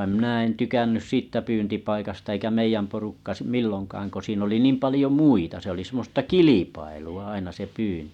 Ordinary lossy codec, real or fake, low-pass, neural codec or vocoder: none; real; 19.8 kHz; none